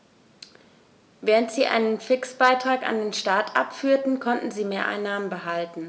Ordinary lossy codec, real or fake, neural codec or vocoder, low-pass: none; real; none; none